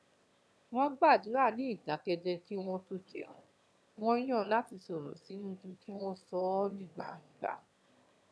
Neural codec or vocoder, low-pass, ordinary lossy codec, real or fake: autoencoder, 22.05 kHz, a latent of 192 numbers a frame, VITS, trained on one speaker; none; none; fake